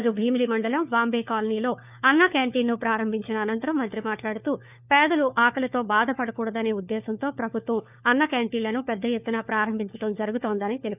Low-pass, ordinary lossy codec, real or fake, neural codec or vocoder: 3.6 kHz; none; fake; codec, 16 kHz, 4 kbps, FunCodec, trained on LibriTTS, 50 frames a second